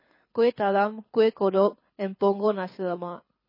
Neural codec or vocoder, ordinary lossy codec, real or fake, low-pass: codec, 24 kHz, 6 kbps, HILCodec; MP3, 24 kbps; fake; 5.4 kHz